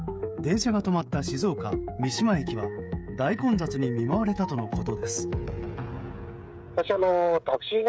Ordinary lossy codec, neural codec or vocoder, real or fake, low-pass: none; codec, 16 kHz, 16 kbps, FreqCodec, smaller model; fake; none